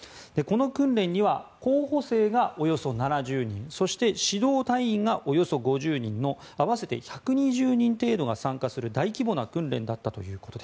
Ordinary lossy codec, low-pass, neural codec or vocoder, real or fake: none; none; none; real